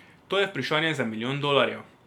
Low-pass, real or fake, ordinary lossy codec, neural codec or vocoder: 19.8 kHz; real; none; none